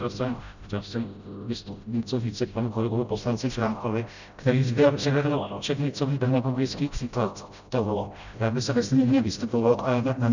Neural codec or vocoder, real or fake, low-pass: codec, 16 kHz, 0.5 kbps, FreqCodec, smaller model; fake; 7.2 kHz